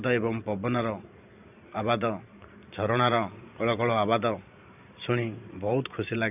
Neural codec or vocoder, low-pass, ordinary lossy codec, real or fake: vocoder, 44.1 kHz, 128 mel bands every 512 samples, BigVGAN v2; 3.6 kHz; none; fake